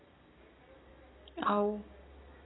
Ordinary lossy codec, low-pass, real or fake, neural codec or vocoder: AAC, 16 kbps; 7.2 kHz; real; none